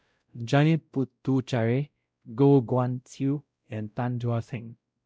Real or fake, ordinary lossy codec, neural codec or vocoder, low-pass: fake; none; codec, 16 kHz, 0.5 kbps, X-Codec, WavLM features, trained on Multilingual LibriSpeech; none